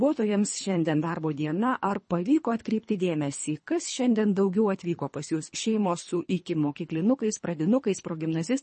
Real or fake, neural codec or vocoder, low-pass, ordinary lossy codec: fake; codec, 24 kHz, 3 kbps, HILCodec; 10.8 kHz; MP3, 32 kbps